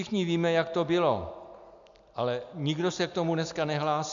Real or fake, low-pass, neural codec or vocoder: real; 7.2 kHz; none